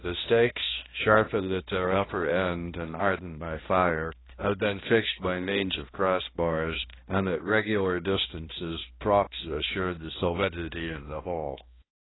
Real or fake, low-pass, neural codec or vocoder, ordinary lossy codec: fake; 7.2 kHz; codec, 16 kHz, 1 kbps, X-Codec, HuBERT features, trained on balanced general audio; AAC, 16 kbps